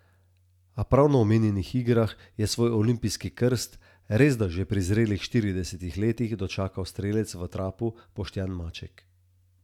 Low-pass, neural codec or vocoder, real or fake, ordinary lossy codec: 19.8 kHz; none; real; none